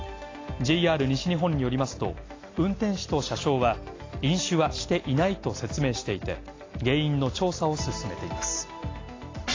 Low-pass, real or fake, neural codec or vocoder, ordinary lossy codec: 7.2 kHz; real; none; AAC, 32 kbps